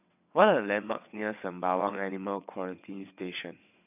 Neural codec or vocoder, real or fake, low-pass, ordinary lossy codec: vocoder, 22.05 kHz, 80 mel bands, WaveNeXt; fake; 3.6 kHz; none